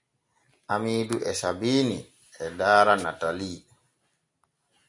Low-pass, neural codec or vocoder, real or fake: 10.8 kHz; none; real